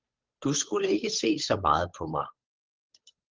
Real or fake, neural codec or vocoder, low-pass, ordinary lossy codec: fake; codec, 16 kHz, 8 kbps, FunCodec, trained on Chinese and English, 25 frames a second; 7.2 kHz; Opus, 32 kbps